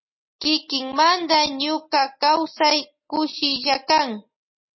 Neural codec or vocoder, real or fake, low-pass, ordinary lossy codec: none; real; 7.2 kHz; MP3, 24 kbps